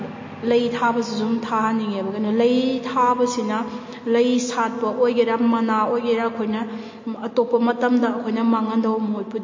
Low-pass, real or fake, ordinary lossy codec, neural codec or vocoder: 7.2 kHz; real; MP3, 32 kbps; none